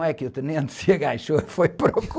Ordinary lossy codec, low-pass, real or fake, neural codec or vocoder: none; none; real; none